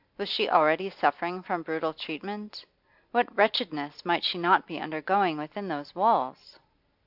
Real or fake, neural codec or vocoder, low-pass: real; none; 5.4 kHz